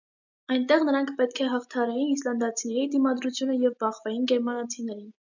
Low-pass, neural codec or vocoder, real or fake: 7.2 kHz; none; real